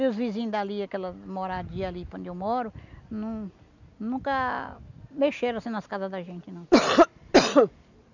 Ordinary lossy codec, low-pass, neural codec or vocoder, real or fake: none; 7.2 kHz; none; real